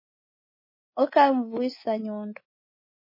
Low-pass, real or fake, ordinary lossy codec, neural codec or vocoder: 5.4 kHz; fake; MP3, 24 kbps; codec, 24 kHz, 3.1 kbps, DualCodec